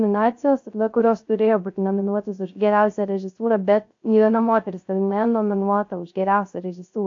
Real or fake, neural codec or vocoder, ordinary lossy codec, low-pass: fake; codec, 16 kHz, 0.3 kbps, FocalCodec; AAC, 48 kbps; 7.2 kHz